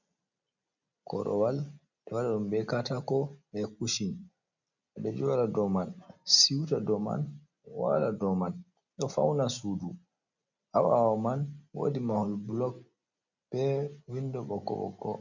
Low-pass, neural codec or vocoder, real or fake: 7.2 kHz; none; real